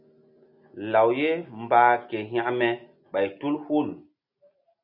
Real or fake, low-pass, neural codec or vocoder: real; 5.4 kHz; none